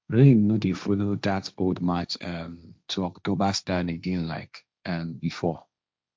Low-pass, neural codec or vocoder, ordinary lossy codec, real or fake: none; codec, 16 kHz, 1.1 kbps, Voila-Tokenizer; none; fake